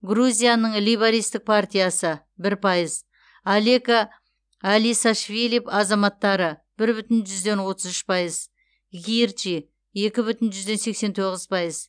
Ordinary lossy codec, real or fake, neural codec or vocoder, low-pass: none; real; none; 9.9 kHz